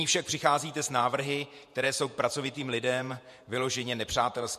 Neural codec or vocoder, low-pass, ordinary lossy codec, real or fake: vocoder, 48 kHz, 128 mel bands, Vocos; 14.4 kHz; MP3, 64 kbps; fake